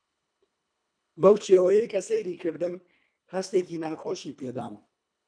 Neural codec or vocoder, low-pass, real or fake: codec, 24 kHz, 1.5 kbps, HILCodec; 9.9 kHz; fake